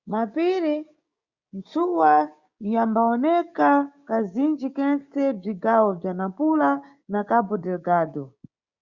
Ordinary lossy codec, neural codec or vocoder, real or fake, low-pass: Opus, 64 kbps; codec, 16 kHz, 6 kbps, DAC; fake; 7.2 kHz